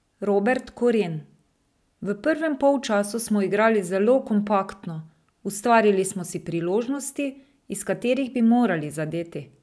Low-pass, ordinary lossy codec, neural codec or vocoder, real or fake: none; none; none; real